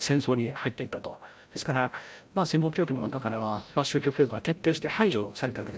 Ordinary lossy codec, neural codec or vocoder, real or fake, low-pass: none; codec, 16 kHz, 0.5 kbps, FreqCodec, larger model; fake; none